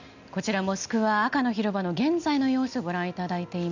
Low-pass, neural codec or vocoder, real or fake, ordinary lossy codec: 7.2 kHz; none; real; none